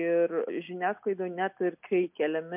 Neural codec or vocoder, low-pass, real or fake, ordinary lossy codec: none; 3.6 kHz; real; MP3, 32 kbps